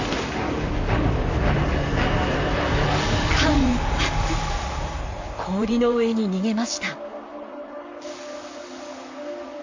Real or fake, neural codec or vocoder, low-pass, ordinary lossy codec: fake; vocoder, 44.1 kHz, 128 mel bands, Pupu-Vocoder; 7.2 kHz; AAC, 48 kbps